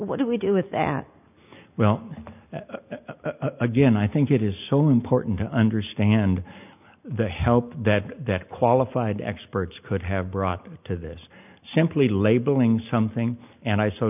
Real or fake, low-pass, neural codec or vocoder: real; 3.6 kHz; none